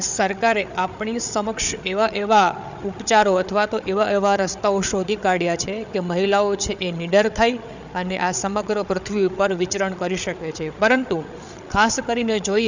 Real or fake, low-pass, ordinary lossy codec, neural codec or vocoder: fake; 7.2 kHz; none; codec, 16 kHz, 8 kbps, FreqCodec, larger model